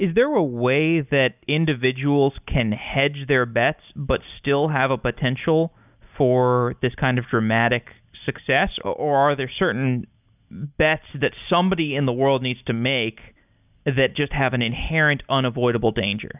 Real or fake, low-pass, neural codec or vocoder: real; 3.6 kHz; none